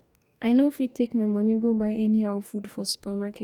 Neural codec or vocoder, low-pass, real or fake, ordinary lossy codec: codec, 44.1 kHz, 2.6 kbps, DAC; 19.8 kHz; fake; none